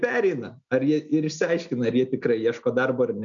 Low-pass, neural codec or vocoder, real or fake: 7.2 kHz; none; real